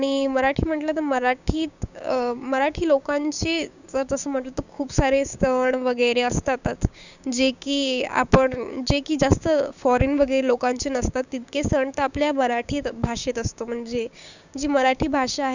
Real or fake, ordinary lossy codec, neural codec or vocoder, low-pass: fake; none; codec, 16 kHz, 6 kbps, DAC; 7.2 kHz